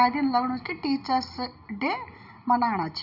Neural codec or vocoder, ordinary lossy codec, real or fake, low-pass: none; none; real; 5.4 kHz